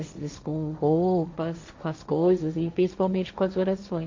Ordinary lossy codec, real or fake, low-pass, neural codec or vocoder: MP3, 64 kbps; fake; 7.2 kHz; codec, 16 kHz, 1.1 kbps, Voila-Tokenizer